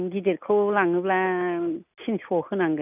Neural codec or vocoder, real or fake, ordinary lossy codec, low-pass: none; real; none; 3.6 kHz